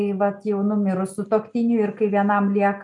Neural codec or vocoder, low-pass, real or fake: none; 10.8 kHz; real